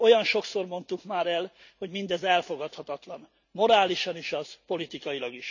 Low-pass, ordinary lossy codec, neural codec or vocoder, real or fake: 7.2 kHz; none; none; real